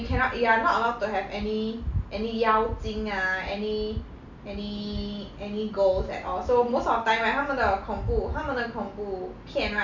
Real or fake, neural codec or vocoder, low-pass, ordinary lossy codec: real; none; 7.2 kHz; none